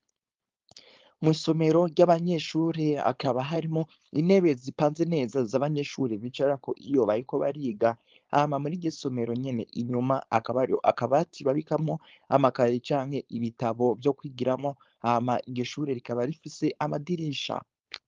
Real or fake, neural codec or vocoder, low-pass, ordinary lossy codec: fake; codec, 16 kHz, 4.8 kbps, FACodec; 7.2 kHz; Opus, 32 kbps